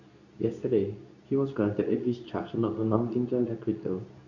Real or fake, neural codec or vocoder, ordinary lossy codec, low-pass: fake; codec, 24 kHz, 0.9 kbps, WavTokenizer, medium speech release version 2; none; 7.2 kHz